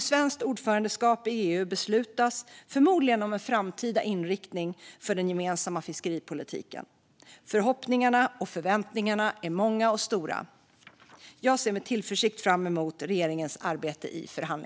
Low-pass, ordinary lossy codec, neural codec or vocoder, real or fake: none; none; none; real